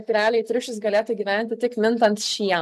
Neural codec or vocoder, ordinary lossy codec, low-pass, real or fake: none; MP3, 96 kbps; 14.4 kHz; real